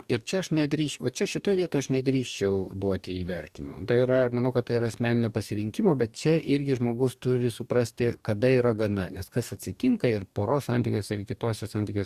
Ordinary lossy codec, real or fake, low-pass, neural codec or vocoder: Opus, 64 kbps; fake; 14.4 kHz; codec, 44.1 kHz, 2.6 kbps, DAC